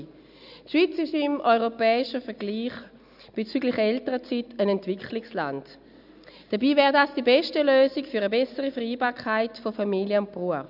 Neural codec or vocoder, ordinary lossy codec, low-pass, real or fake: none; none; 5.4 kHz; real